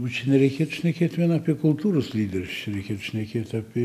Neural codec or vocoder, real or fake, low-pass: none; real; 14.4 kHz